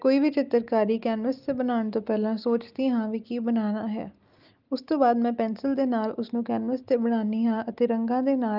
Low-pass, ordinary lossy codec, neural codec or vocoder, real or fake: 5.4 kHz; Opus, 24 kbps; none; real